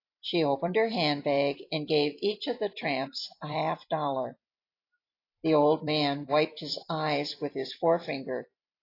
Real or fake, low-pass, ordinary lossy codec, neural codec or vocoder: fake; 5.4 kHz; AAC, 32 kbps; vocoder, 44.1 kHz, 128 mel bands every 256 samples, BigVGAN v2